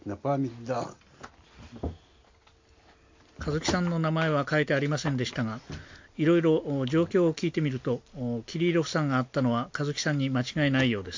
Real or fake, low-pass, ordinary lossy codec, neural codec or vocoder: real; 7.2 kHz; MP3, 48 kbps; none